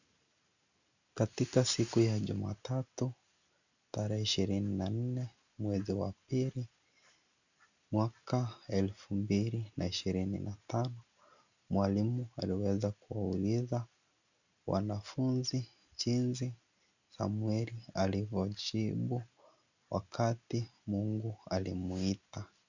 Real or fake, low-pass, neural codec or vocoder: real; 7.2 kHz; none